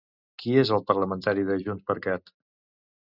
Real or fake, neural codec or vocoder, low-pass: real; none; 5.4 kHz